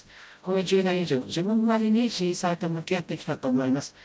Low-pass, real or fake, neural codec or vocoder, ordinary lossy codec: none; fake; codec, 16 kHz, 0.5 kbps, FreqCodec, smaller model; none